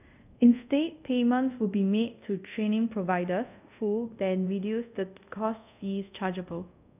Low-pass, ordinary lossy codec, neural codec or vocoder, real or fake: 3.6 kHz; none; codec, 24 kHz, 0.5 kbps, DualCodec; fake